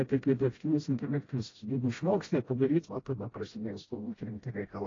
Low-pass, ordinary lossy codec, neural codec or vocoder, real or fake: 7.2 kHz; AAC, 32 kbps; codec, 16 kHz, 0.5 kbps, FreqCodec, smaller model; fake